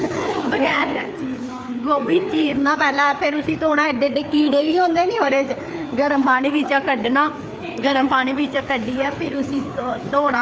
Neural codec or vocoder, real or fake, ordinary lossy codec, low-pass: codec, 16 kHz, 4 kbps, FreqCodec, larger model; fake; none; none